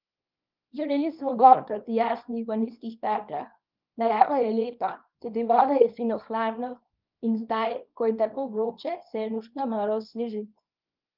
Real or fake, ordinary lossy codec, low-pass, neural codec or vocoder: fake; Opus, 24 kbps; 5.4 kHz; codec, 24 kHz, 0.9 kbps, WavTokenizer, small release